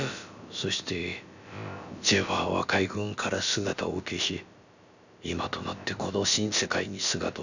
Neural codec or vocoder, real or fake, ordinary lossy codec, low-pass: codec, 16 kHz, about 1 kbps, DyCAST, with the encoder's durations; fake; none; 7.2 kHz